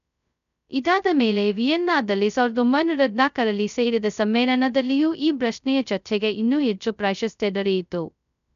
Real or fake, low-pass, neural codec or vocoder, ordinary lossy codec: fake; 7.2 kHz; codec, 16 kHz, 0.2 kbps, FocalCodec; none